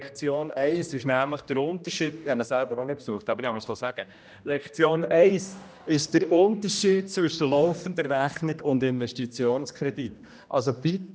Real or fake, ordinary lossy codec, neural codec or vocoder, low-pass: fake; none; codec, 16 kHz, 1 kbps, X-Codec, HuBERT features, trained on general audio; none